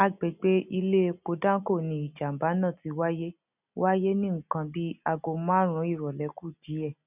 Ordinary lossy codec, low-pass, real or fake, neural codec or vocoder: none; 3.6 kHz; real; none